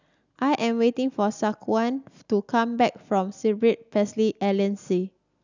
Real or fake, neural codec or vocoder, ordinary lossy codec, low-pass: real; none; none; 7.2 kHz